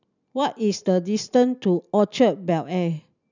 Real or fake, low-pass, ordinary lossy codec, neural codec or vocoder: real; 7.2 kHz; none; none